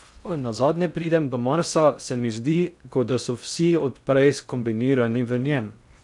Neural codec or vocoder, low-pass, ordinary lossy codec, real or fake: codec, 16 kHz in and 24 kHz out, 0.6 kbps, FocalCodec, streaming, 2048 codes; 10.8 kHz; none; fake